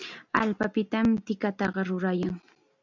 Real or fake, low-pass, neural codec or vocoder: real; 7.2 kHz; none